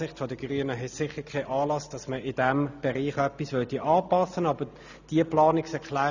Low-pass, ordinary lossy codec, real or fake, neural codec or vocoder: 7.2 kHz; none; real; none